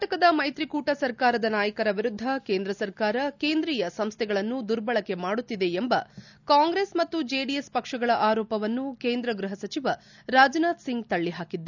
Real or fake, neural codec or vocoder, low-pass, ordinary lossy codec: real; none; 7.2 kHz; none